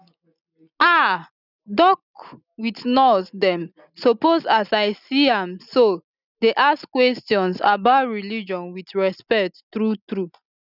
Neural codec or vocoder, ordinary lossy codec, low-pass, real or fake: none; none; 5.4 kHz; real